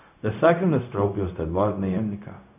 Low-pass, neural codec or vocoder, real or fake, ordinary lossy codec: 3.6 kHz; codec, 16 kHz, 0.4 kbps, LongCat-Audio-Codec; fake; none